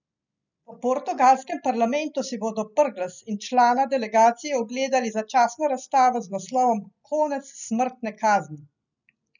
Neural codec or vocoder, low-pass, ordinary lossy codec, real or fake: none; 7.2 kHz; none; real